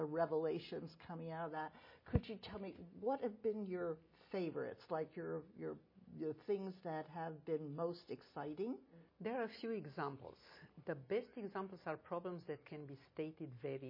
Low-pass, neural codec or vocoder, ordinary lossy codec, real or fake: 5.4 kHz; none; MP3, 24 kbps; real